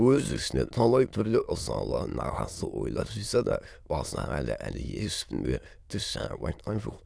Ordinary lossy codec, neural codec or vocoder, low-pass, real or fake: none; autoencoder, 22.05 kHz, a latent of 192 numbers a frame, VITS, trained on many speakers; none; fake